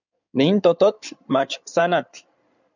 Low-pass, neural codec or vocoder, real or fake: 7.2 kHz; codec, 16 kHz in and 24 kHz out, 2.2 kbps, FireRedTTS-2 codec; fake